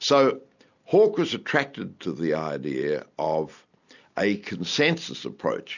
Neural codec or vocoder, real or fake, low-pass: none; real; 7.2 kHz